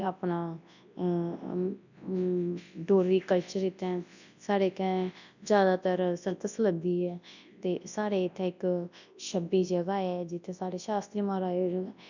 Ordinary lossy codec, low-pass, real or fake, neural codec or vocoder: none; 7.2 kHz; fake; codec, 24 kHz, 0.9 kbps, WavTokenizer, large speech release